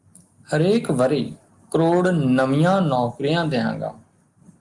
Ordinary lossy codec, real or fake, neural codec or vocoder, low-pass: Opus, 32 kbps; real; none; 10.8 kHz